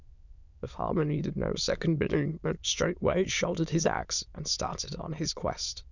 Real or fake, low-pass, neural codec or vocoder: fake; 7.2 kHz; autoencoder, 22.05 kHz, a latent of 192 numbers a frame, VITS, trained on many speakers